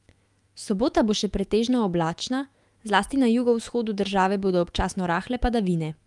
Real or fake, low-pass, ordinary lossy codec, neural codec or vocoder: fake; 10.8 kHz; Opus, 32 kbps; autoencoder, 48 kHz, 128 numbers a frame, DAC-VAE, trained on Japanese speech